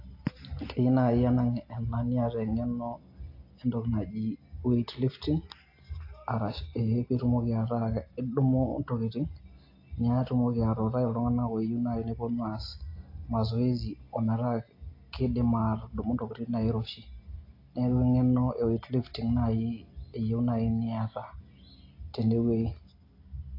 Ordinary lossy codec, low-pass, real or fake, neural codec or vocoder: AAC, 32 kbps; 5.4 kHz; real; none